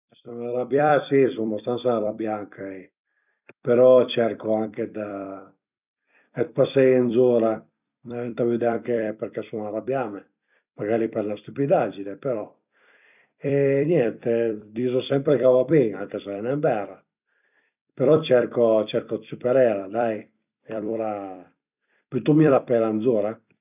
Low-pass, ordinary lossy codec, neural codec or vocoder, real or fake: 3.6 kHz; none; vocoder, 44.1 kHz, 128 mel bands every 256 samples, BigVGAN v2; fake